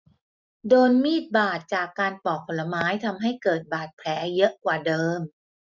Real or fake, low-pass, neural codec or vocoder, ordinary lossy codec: real; 7.2 kHz; none; none